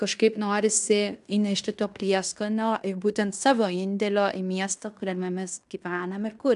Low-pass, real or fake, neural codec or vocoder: 10.8 kHz; fake; codec, 16 kHz in and 24 kHz out, 0.9 kbps, LongCat-Audio-Codec, fine tuned four codebook decoder